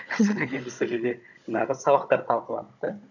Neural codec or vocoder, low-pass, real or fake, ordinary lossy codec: codec, 16 kHz, 16 kbps, FunCodec, trained on Chinese and English, 50 frames a second; 7.2 kHz; fake; none